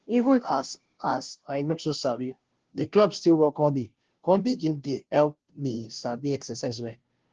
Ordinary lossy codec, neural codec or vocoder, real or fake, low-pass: Opus, 16 kbps; codec, 16 kHz, 0.5 kbps, FunCodec, trained on Chinese and English, 25 frames a second; fake; 7.2 kHz